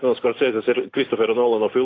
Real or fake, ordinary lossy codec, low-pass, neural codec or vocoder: real; AAC, 32 kbps; 7.2 kHz; none